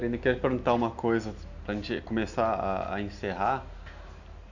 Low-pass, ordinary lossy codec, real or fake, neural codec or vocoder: 7.2 kHz; none; real; none